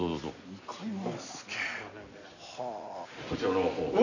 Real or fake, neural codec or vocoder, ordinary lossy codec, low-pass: real; none; none; 7.2 kHz